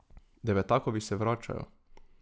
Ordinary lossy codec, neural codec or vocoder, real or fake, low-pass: none; none; real; none